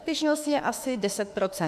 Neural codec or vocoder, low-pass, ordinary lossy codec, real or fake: autoencoder, 48 kHz, 32 numbers a frame, DAC-VAE, trained on Japanese speech; 14.4 kHz; MP3, 96 kbps; fake